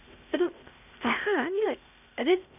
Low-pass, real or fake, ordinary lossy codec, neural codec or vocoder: 3.6 kHz; fake; none; codec, 16 kHz, 0.8 kbps, ZipCodec